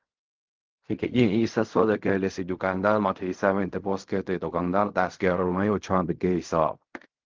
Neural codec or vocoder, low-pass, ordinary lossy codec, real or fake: codec, 16 kHz in and 24 kHz out, 0.4 kbps, LongCat-Audio-Codec, fine tuned four codebook decoder; 7.2 kHz; Opus, 24 kbps; fake